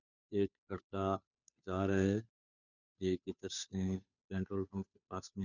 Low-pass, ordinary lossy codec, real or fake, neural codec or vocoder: 7.2 kHz; none; fake; codec, 16 kHz, 2 kbps, FunCodec, trained on Chinese and English, 25 frames a second